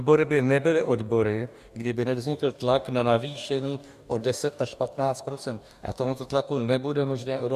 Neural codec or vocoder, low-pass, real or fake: codec, 44.1 kHz, 2.6 kbps, DAC; 14.4 kHz; fake